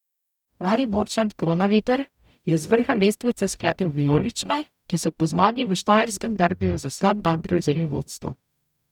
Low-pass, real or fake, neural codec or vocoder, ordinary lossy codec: 19.8 kHz; fake; codec, 44.1 kHz, 0.9 kbps, DAC; none